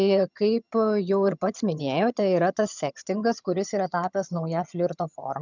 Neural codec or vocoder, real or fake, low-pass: vocoder, 22.05 kHz, 80 mel bands, HiFi-GAN; fake; 7.2 kHz